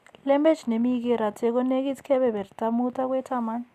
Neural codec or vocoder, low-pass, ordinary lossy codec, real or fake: none; 14.4 kHz; Opus, 64 kbps; real